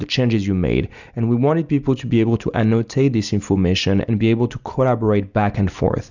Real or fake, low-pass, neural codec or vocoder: real; 7.2 kHz; none